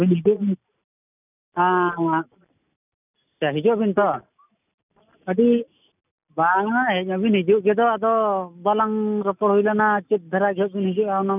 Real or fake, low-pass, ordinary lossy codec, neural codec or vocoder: real; 3.6 kHz; none; none